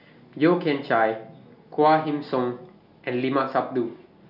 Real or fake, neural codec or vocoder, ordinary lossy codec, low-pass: real; none; none; 5.4 kHz